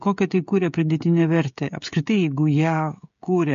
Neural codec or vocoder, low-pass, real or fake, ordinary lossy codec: codec, 16 kHz, 16 kbps, FreqCodec, smaller model; 7.2 kHz; fake; MP3, 48 kbps